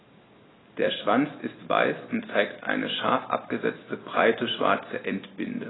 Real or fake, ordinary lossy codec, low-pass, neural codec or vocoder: real; AAC, 16 kbps; 7.2 kHz; none